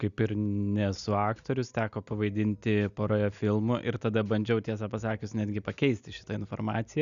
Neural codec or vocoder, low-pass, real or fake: none; 7.2 kHz; real